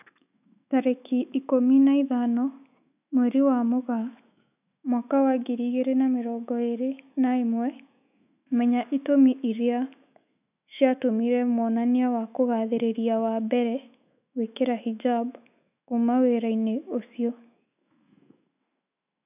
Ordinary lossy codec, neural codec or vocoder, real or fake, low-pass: none; autoencoder, 48 kHz, 128 numbers a frame, DAC-VAE, trained on Japanese speech; fake; 3.6 kHz